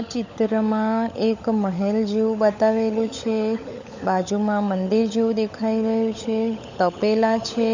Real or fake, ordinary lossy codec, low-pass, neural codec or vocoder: fake; none; 7.2 kHz; codec, 16 kHz, 16 kbps, FunCodec, trained on LibriTTS, 50 frames a second